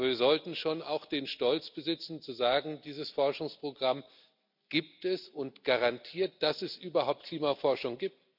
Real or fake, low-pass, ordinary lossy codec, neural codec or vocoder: real; 5.4 kHz; none; none